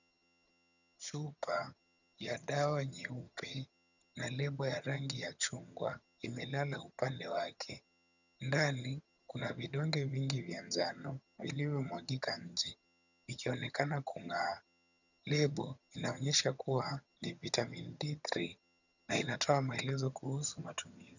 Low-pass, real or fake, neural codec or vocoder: 7.2 kHz; fake; vocoder, 22.05 kHz, 80 mel bands, HiFi-GAN